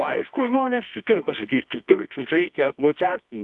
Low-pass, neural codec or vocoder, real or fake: 10.8 kHz; codec, 24 kHz, 0.9 kbps, WavTokenizer, medium music audio release; fake